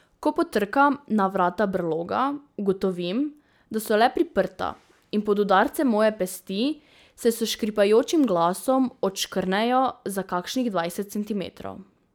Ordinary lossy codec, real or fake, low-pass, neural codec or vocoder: none; real; none; none